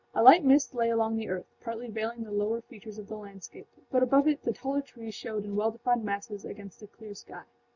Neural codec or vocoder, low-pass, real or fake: none; 7.2 kHz; real